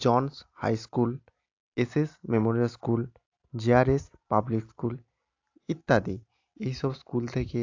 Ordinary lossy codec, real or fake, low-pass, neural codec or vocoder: AAC, 48 kbps; real; 7.2 kHz; none